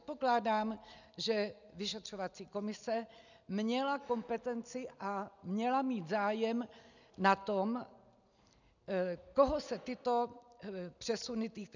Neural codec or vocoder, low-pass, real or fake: none; 7.2 kHz; real